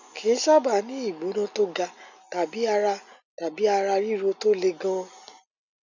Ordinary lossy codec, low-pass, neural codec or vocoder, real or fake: none; 7.2 kHz; none; real